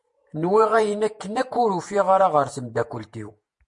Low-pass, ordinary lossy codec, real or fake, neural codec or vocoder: 10.8 kHz; MP3, 48 kbps; fake; vocoder, 48 kHz, 128 mel bands, Vocos